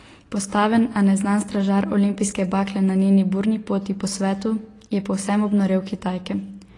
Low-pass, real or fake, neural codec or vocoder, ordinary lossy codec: 10.8 kHz; real; none; AAC, 32 kbps